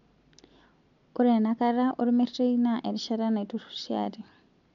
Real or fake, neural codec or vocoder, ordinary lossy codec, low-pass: real; none; MP3, 64 kbps; 7.2 kHz